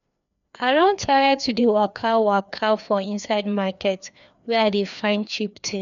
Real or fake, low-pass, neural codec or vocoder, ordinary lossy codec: fake; 7.2 kHz; codec, 16 kHz, 2 kbps, FreqCodec, larger model; none